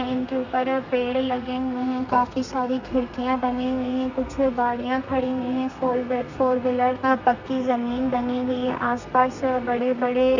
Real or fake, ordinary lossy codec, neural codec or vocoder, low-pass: fake; none; codec, 32 kHz, 1.9 kbps, SNAC; 7.2 kHz